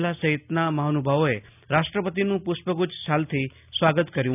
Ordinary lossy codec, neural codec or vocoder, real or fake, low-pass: none; none; real; 3.6 kHz